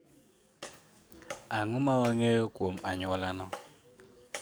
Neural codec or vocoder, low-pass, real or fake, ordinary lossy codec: codec, 44.1 kHz, 7.8 kbps, DAC; none; fake; none